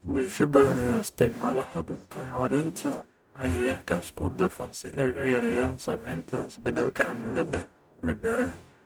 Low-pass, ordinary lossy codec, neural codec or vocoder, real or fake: none; none; codec, 44.1 kHz, 0.9 kbps, DAC; fake